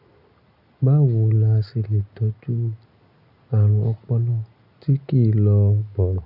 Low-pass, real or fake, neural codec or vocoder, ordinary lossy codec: 5.4 kHz; real; none; none